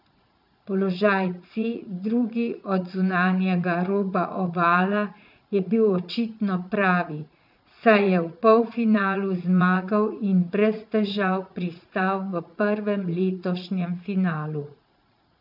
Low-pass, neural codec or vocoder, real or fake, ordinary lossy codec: 5.4 kHz; vocoder, 44.1 kHz, 80 mel bands, Vocos; fake; none